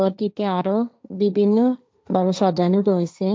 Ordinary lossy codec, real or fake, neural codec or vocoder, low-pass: none; fake; codec, 16 kHz, 1.1 kbps, Voila-Tokenizer; none